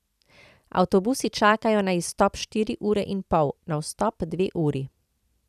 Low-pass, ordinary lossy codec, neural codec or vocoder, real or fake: 14.4 kHz; none; vocoder, 44.1 kHz, 128 mel bands every 256 samples, BigVGAN v2; fake